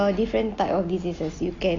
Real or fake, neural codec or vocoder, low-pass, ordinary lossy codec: real; none; 7.2 kHz; AAC, 32 kbps